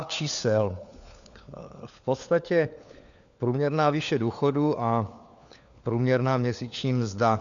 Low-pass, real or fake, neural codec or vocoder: 7.2 kHz; fake; codec, 16 kHz, 4 kbps, FunCodec, trained on LibriTTS, 50 frames a second